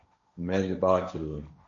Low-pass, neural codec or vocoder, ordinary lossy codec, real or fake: 7.2 kHz; codec, 16 kHz, 1.1 kbps, Voila-Tokenizer; MP3, 64 kbps; fake